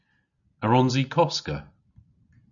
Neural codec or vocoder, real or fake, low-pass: none; real; 7.2 kHz